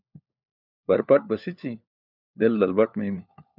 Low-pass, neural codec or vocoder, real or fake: 5.4 kHz; codec, 16 kHz, 4 kbps, FunCodec, trained on LibriTTS, 50 frames a second; fake